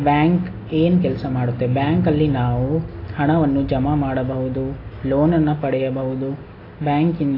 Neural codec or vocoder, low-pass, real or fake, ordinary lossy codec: none; 5.4 kHz; real; AAC, 24 kbps